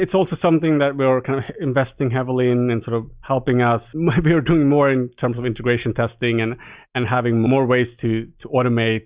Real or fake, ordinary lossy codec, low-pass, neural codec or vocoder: real; Opus, 64 kbps; 3.6 kHz; none